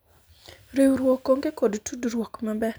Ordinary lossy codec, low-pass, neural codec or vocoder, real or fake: none; none; none; real